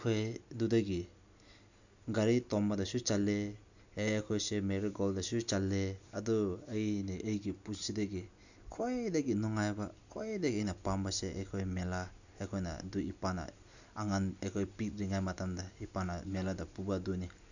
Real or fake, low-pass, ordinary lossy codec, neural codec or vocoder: real; 7.2 kHz; none; none